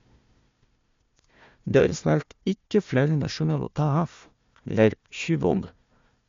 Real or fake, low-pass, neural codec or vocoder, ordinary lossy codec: fake; 7.2 kHz; codec, 16 kHz, 1 kbps, FunCodec, trained on Chinese and English, 50 frames a second; MP3, 48 kbps